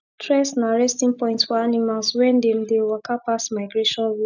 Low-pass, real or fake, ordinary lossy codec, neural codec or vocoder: 7.2 kHz; real; none; none